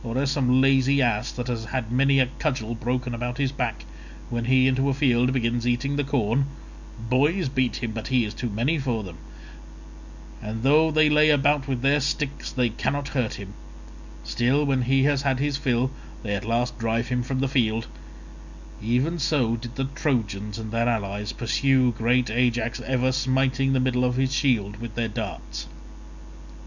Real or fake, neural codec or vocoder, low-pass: real; none; 7.2 kHz